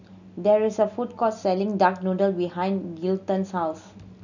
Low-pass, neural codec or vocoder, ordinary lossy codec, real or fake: 7.2 kHz; none; none; real